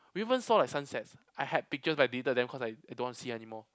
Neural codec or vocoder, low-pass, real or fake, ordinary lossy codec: none; none; real; none